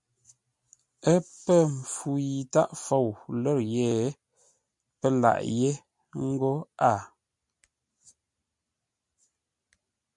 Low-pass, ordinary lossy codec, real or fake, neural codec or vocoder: 10.8 kHz; MP3, 96 kbps; real; none